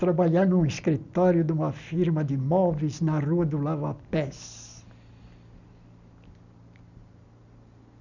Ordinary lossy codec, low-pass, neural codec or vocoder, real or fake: none; 7.2 kHz; none; real